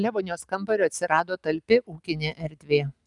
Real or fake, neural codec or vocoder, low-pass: real; none; 10.8 kHz